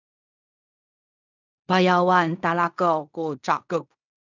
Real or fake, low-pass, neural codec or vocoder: fake; 7.2 kHz; codec, 16 kHz in and 24 kHz out, 0.4 kbps, LongCat-Audio-Codec, fine tuned four codebook decoder